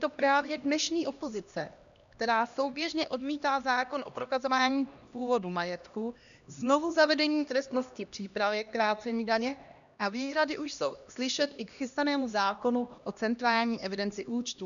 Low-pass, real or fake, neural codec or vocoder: 7.2 kHz; fake; codec, 16 kHz, 1 kbps, X-Codec, HuBERT features, trained on LibriSpeech